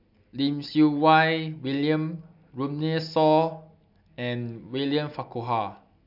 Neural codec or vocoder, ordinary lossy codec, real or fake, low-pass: none; none; real; 5.4 kHz